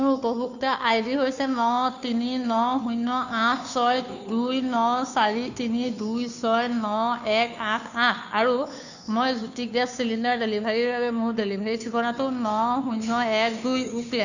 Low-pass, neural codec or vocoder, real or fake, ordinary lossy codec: 7.2 kHz; codec, 16 kHz, 2 kbps, FunCodec, trained on Chinese and English, 25 frames a second; fake; none